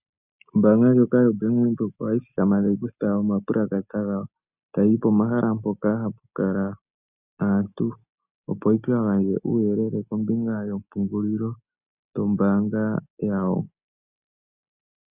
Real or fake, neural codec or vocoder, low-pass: real; none; 3.6 kHz